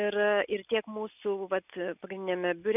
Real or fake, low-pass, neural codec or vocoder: real; 3.6 kHz; none